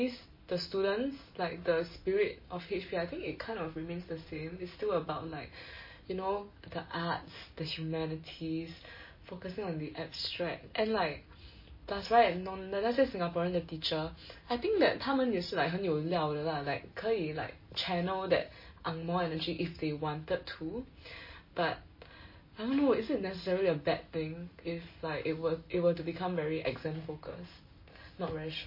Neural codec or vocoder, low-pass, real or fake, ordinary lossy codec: none; 5.4 kHz; real; MP3, 24 kbps